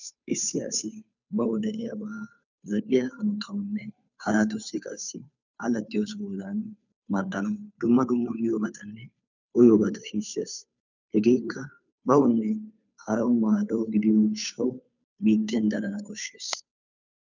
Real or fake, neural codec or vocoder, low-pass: fake; codec, 16 kHz, 2 kbps, FunCodec, trained on Chinese and English, 25 frames a second; 7.2 kHz